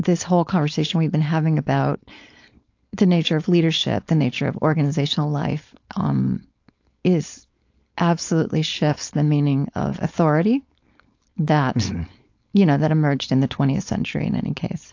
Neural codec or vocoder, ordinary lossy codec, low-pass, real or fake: codec, 16 kHz, 4.8 kbps, FACodec; AAC, 48 kbps; 7.2 kHz; fake